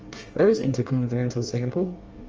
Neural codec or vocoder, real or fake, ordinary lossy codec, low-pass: codec, 24 kHz, 1 kbps, SNAC; fake; Opus, 24 kbps; 7.2 kHz